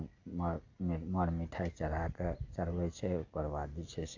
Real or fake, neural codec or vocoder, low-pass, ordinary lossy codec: real; none; 7.2 kHz; AAC, 48 kbps